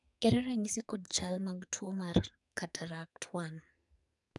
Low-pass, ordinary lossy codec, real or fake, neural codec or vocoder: 10.8 kHz; none; fake; codec, 44.1 kHz, 2.6 kbps, SNAC